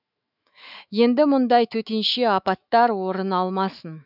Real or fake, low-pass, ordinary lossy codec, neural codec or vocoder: fake; 5.4 kHz; none; autoencoder, 48 kHz, 128 numbers a frame, DAC-VAE, trained on Japanese speech